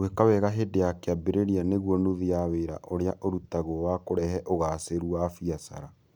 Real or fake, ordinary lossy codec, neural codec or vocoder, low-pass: real; none; none; none